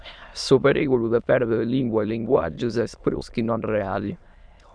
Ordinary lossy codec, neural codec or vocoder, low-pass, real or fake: MP3, 96 kbps; autoencoder, 22.05 kHz, a latent of 192 numbers a frame, VITS, trained on many speakers; 9.9 kHz; fake